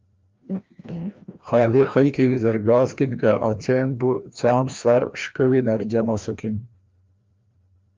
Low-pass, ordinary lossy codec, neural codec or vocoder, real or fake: 7.2 kHz; Opus, 32 kbps; codec, 16 kHz, 1 kbps, FreqCodec, larger model; fake